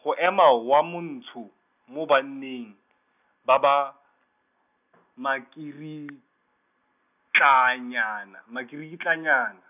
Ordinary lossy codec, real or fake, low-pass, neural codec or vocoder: none; real; 3.6 kHz; none